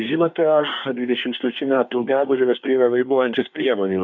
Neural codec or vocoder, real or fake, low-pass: codec, 24 kHz, 1 kbps, SNAC; fake; 7.2 kHz